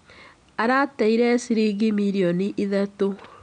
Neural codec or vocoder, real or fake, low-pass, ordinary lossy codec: none; real; 9.9 kHz; none